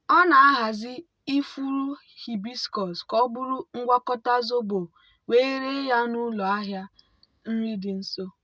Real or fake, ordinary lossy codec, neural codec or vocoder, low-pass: real; none; none; none